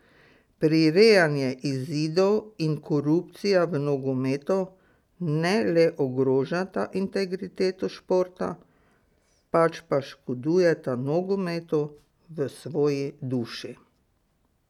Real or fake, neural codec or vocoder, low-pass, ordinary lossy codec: real; none; 19.8 kHz; none